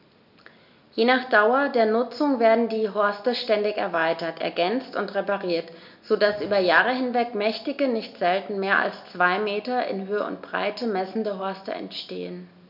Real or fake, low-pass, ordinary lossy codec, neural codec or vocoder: real; 5.4 kHz; none; none